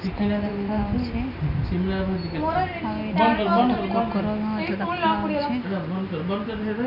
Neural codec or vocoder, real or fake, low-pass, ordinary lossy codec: none; real; 5.4 kHz; none